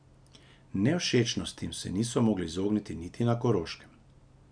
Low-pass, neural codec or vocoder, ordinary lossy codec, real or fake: 9.9 kHz; none; none; real